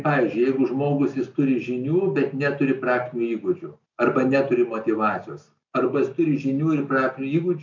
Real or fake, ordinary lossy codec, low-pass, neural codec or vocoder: real; MP3, 64 kbps; 7.2 kHz; none